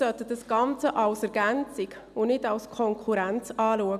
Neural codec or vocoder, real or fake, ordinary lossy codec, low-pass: none; real; none; 14.4 kHz